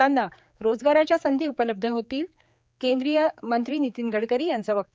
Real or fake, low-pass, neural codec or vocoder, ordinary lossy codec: fake; none; codec, 16 kHz, 4 kbps, X-Codec, HuBERT features, trained on general audio; none